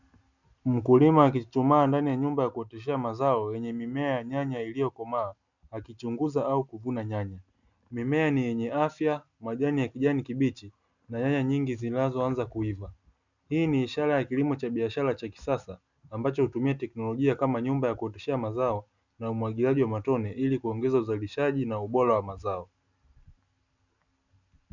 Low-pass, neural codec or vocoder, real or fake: 7.2 kHz; none; real